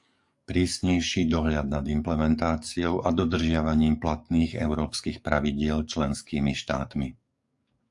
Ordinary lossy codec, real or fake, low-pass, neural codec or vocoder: AAC, 64 kbps; fake; 10.8 kHz; codec, 44.1 kHz, 7.8 kbps, DAC